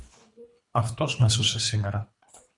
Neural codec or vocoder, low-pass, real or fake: codec, 24 kHz, 3 kbps, HILCodec; 10.8 kHz; fake